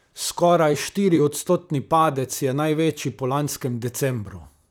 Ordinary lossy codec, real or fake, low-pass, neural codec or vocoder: none; fake; none; vocoder, 44.1 kHz, 128 mel bands, Pupu-Vocoder